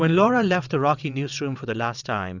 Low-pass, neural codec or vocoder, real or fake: 7.2 kHz; vocoder, 22.05 kHz, 80 mel bands, WaveNeXt; fake